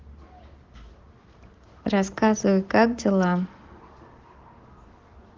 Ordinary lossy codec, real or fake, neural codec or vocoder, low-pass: Opus, 16 kbps; real; none; 7.2 kHz